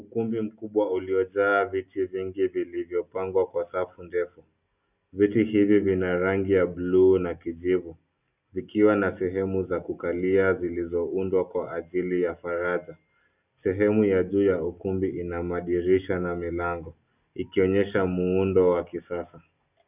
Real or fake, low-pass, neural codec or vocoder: real; 3.6 kHz; none